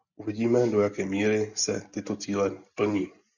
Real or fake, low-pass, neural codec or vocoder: real; 7.2 kHz; none